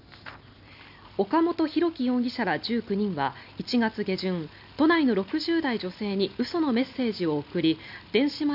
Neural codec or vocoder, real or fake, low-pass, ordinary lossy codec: none; real; 5.4 kHz; none